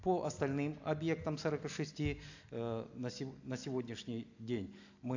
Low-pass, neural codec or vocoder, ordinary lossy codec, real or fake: 7.2 kHz; none; AAC, 48 kbps; real